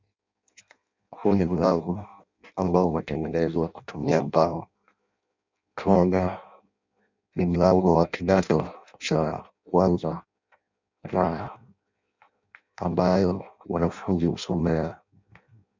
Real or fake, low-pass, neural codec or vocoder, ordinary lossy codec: fake; 7.2 kHz; codec, 16 kHz in and 24 kHz out, 0.6 kbps, FireRedTTS-2 codec; MP3, 64 kbps